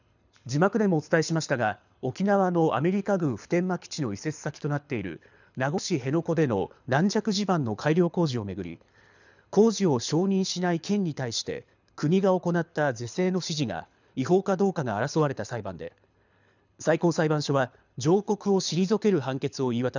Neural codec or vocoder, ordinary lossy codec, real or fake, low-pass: codec, 24 kHz, 6 kbps, HILCodec; none; fake; 7.2 kHz